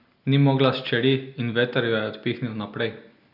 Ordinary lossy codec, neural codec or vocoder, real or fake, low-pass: none; none; real; 5.4 kHz